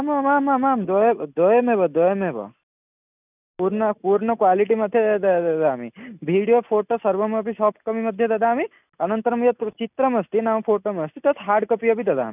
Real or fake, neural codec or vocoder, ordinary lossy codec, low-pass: real; none; none; 3.6 kHz